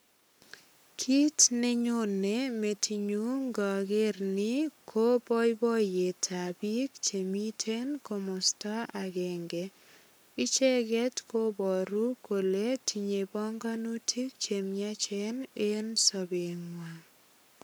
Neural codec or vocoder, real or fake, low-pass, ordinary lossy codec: codec, 44.1 kHz, 7.8 kbps, Pupu-Codec; fake; none; none